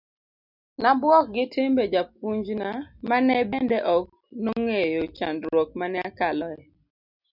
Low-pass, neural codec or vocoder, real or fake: 5.4 kHz; none; real